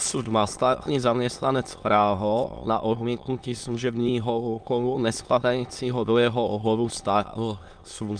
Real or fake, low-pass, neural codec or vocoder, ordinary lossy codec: fake; 9.9 kHz; autoencoder, 22.05 kHz, a latent of 192 numbers a frame, VITS, trained on many speakers; Opus, 32 kbps